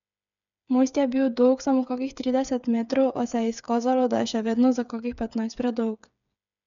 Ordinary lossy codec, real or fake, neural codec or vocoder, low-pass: none; fake; codec, 16 kHz, 8 kbps, FreqCodec, smaller model; 7.2 kHz